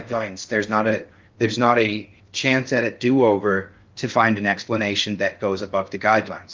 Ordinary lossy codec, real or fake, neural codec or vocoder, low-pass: Opus, 32 kbps; fake; codec, 16 kHz in and 24 kHz out, 0.6 kbps, FocalCodec, streaming, 2048 codes; 7.2 kHz